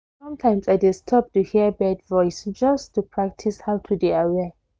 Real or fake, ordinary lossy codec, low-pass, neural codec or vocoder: real; none; none; none